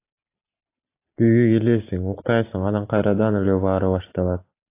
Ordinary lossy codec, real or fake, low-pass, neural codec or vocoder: AAC, 24 kbps; real; 3.6 kHz; none